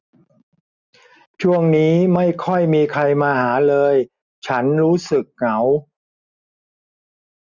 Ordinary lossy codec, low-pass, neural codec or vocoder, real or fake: none; 7.2 kHz; none; real